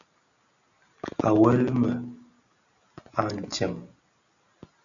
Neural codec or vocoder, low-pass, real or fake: none; 7.2 kHz; real